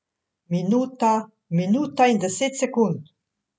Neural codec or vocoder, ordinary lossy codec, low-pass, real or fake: none; none; none; real